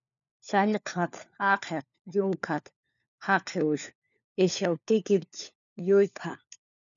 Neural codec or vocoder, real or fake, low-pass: codec, 16 kHz, 4 kbps, FunCodec, trained on LibriTTS, 50 frames a second; fake; 7.2 kHz